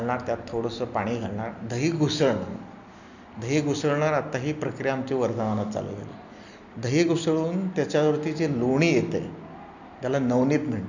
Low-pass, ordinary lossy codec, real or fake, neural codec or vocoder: 7.2 kHz; none; real; none